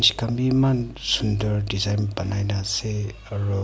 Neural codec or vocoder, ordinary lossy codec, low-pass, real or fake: none; none; none; real